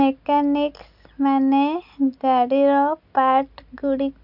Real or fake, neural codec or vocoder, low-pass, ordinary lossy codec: real; none; 5.4 kHz; none